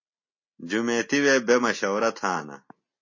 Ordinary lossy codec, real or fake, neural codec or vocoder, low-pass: MP3, 32 kbps; real; none; 7.2 kHz